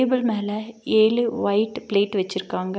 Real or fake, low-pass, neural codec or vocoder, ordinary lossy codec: real; none; none; none